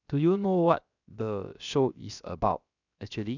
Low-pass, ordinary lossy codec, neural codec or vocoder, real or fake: 7.2 kHz; none; codec, 16 kHz, about 1 kbps, DyCAST, with the encoder's durations; fake